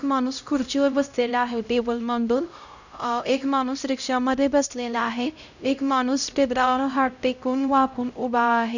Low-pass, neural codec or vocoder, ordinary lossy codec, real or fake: 7.2 kHz; codec, 16 kHz, 0.5 kbps, X-Codec, HuBERT features, trained on LibriSpeech; none; fake